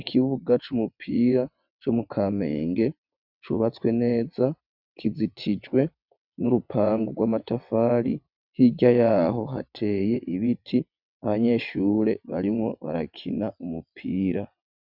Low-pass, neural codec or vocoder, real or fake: 5.4 kHz; vocoder, 22.05 kHz, 80 mel bands, WaveNeXt; fake